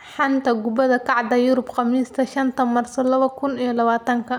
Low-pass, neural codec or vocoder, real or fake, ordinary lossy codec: 19.8 kHz; none; real; none